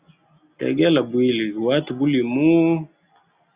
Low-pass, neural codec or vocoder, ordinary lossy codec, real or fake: 3.6 kHz; none; Opus, 64 kbps; real